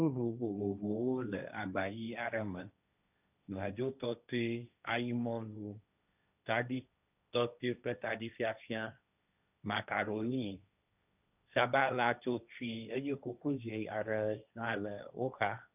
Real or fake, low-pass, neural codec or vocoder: fake; 3.6 kHz; codec, 16 kHz, 1.1 kbps, Voila-Tokenizer